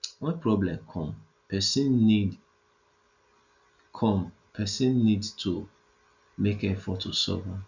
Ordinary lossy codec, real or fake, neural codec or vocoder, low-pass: none; real; none; 7.2 kHz